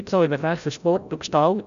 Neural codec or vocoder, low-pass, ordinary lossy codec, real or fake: codec, 16 kHz, 0.5 kbps, FreqCodec, larger model; 7.2 kHz; none; fake